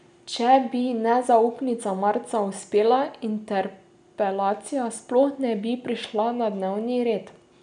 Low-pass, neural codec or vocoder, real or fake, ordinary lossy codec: 9.9 kHz; none; real; none